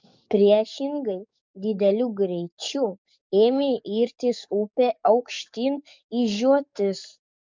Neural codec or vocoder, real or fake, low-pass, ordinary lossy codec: codec, 44.1 kHz, 7.8 kbps, DAC; fake; 7.2 kHz; MP3, 64 kbps